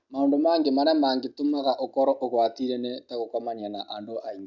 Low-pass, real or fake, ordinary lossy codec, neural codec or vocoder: 7.2 kHz; real; none; none